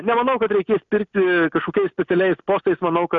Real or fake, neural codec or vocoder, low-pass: real; none; 7.2 kHz